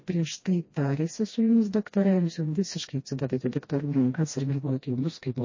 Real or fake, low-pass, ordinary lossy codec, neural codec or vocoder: fake; 7.2 kHz; MP3, 32 kbps; codec, 16 kHz, 1 kbps, FreqCodec, smaller model